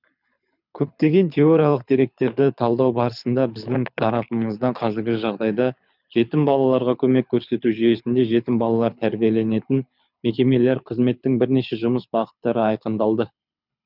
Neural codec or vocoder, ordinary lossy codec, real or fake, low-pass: codec, 24 kHz, 6 kbps, HILCodec; none; fake; 5.4 kHz